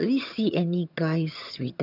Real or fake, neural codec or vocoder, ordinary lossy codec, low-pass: fake; vocoder, 22.05 kHz, 80 mel bands, HiFi-GAN; none; 5.4 kHz